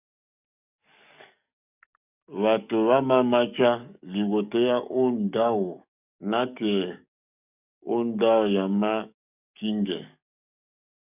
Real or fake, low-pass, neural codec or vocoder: fake; 3.6 kHz; codec, 44.1 kHz, 7.8 kbps, DAC